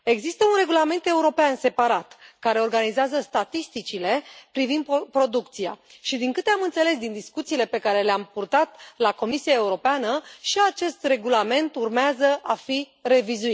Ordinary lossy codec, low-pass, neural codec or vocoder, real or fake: none; none; none; real